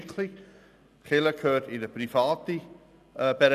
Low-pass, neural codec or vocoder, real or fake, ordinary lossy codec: 14.4 kHz; none; real; none